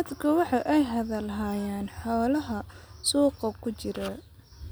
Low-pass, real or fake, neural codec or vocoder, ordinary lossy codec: none; real; none; none